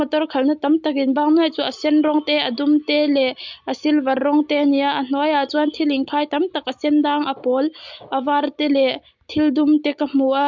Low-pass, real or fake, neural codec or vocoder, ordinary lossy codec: 7.2 kHz; real; none; MP3, 64 kbps